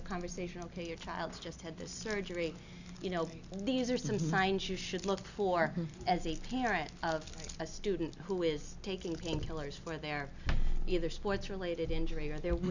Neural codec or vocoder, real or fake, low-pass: none; real; 7.2 kHz